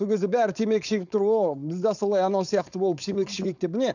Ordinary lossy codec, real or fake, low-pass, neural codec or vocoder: none; fake; 7.2 kHz; codec, 16 kHz, 4.8 kbps, FACodec